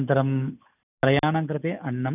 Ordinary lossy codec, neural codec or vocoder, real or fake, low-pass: none; none; real; 3.6 kHz